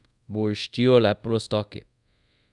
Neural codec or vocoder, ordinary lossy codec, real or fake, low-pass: codec, 24 kHz, 0.5 kbps, DualCodec; none; fake; 10.8 kHz